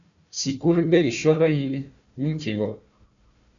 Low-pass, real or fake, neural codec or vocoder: 7.2 kHz; fake; codec, 16 kHz, 1 kbps, FunCodec, trained on Chinese and English, 50 frames a second